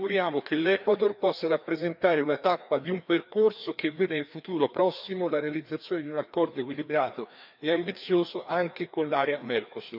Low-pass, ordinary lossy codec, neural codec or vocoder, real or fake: 5.4 kHz; none; codec, 16 kHz, 2 kbps, FreqCodec, larger model; fake